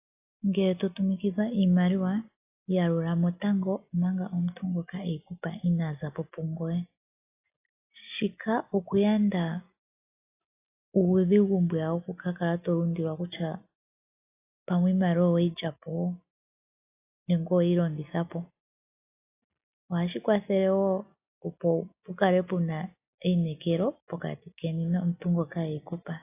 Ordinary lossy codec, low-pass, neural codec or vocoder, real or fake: AAC, 24 kbps; 3.6 kHz; none; real